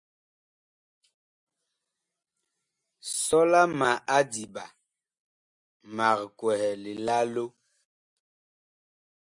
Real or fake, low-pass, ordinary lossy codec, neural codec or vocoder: real; 10.8 kHz; MP3, 96 kbps; none